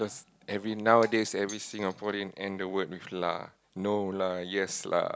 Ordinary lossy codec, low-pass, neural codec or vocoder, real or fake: none; none; none; real